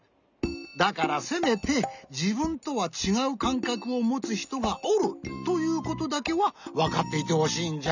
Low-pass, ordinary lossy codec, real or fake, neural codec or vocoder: 7.2 kHz; none; real; none